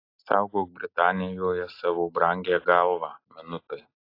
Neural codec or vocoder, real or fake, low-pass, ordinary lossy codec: none; real; 5.4 kHz; AAC, 32 kbps